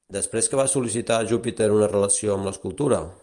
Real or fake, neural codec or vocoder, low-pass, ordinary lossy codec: real; none; 10.8 kHz; Opus, 32 kbps